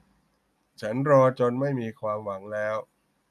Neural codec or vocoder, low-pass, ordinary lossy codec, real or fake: none; 14.4 kHz; none; real